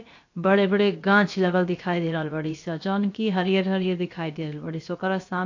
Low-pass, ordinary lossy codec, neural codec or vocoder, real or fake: 7.2 kHz; AAC, 48 kbps; codec, 16 kHz, 0.7 kbps, FocalCodec; fake